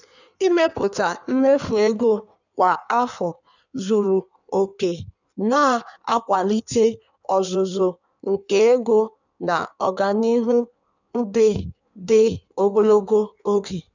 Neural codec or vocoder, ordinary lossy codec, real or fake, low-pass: codec, 16 kHz in and 24 kHz out, 1.1 kbps, FireRedTTS-2 codec; none; fake; 7.2 kHz